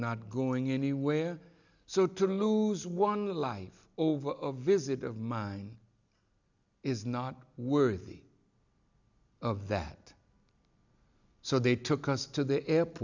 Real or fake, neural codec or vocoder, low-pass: real; none; 7.2 kHz